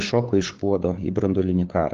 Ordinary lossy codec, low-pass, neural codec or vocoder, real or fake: Opus, 16 kbps; 7.2 kHz; codec, 16 kHz, 4 kbps, FunCodec, trained on LibriTTS, 50 frames a second; fake